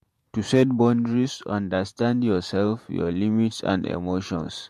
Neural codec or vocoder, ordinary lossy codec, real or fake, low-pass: vocoder, 48 kHz, 128 mel bands, Vocos; MP3, 64 kbps; fake; 14.4 kHz